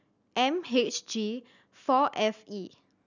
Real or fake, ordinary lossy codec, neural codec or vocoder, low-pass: real; none; none; 7.2 kHz